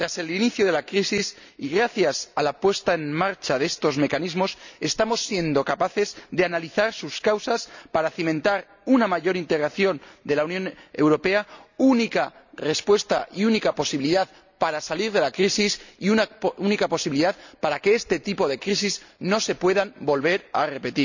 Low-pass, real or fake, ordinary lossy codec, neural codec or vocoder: 7.2 kHz; real; none; none